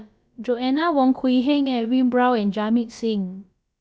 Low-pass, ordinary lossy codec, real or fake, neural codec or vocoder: none; none; fake; codec, 16 kHz, about 1 kbps, DyCAST, with the encoder's durations